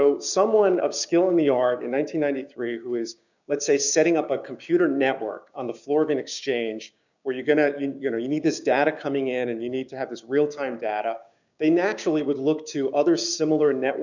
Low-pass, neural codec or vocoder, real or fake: 7.2 kHz; codec, 16 kHz, 6 kbps, DAC; fake